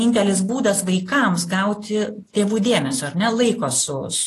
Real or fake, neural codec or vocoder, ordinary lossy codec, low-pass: real; none; AAC, 48 kbps; 14.4 kHz